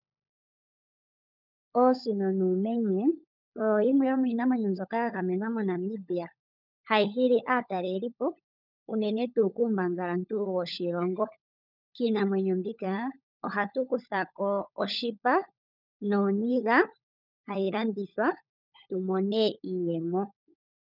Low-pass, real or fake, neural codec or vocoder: 5.4 kHz; fake; codec, 16 kHz, 16 kbps, FunCodec, trained on LibriTTS, 50 frames a second